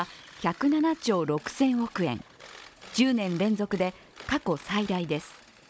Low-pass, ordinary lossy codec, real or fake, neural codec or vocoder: none; none; fake; codec, 16 kHz, 16 kbps, FunCodec, trained on Chinese and English, 50 frames a second